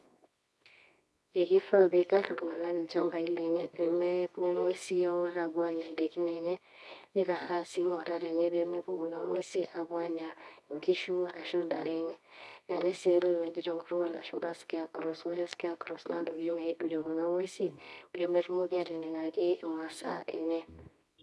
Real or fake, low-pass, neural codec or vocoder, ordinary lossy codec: fake; none; codec, 24 kHz, 0.9 kbps, WavTokenizer, medium music audio release; none